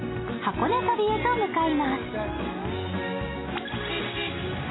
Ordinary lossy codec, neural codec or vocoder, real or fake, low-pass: AAC, 16 kbps; none; real; 7.2 kHz